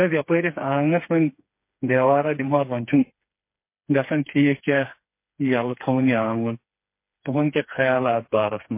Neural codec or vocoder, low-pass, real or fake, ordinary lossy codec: codec, 16 kHz, 4 kbps, FreqCodec, smaller model; 3.6 kHz; fake; MP3, 24 kbps